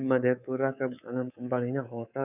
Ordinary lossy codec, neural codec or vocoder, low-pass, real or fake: none; codec, 24 kHz, 3.1 kbps, DualCodec; 3.6 kHz; fake